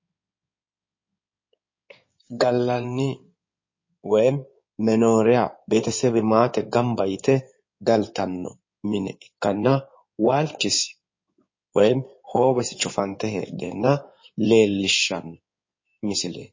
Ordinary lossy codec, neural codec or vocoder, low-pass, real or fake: MP3, 32 kbps; codec, 16 kHz in and 24 kHz out, 2.2 kbps, FireRedTTS-2 codec; 7.2 kHz; fake